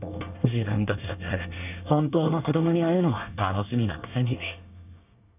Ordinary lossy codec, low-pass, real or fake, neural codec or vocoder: none; 3.6 kHz; fake; codec, 24 kHz, 1 kbps, SNAC